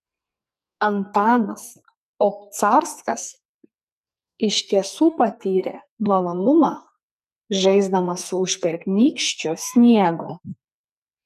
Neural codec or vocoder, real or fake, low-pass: codec, 44.1 kHz, 2.6 kbps, SNAC; fake; 14.4 kHz